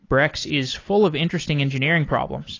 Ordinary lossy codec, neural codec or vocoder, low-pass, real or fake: AAC, 32 kbps; none; 7.2 kHz; real